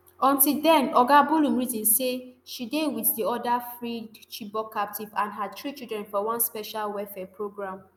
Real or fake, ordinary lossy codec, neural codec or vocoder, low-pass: real; none; none; none